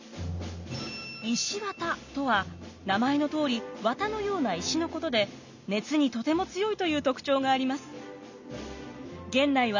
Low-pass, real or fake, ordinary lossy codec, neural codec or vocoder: 7.2 kHz; real; none; none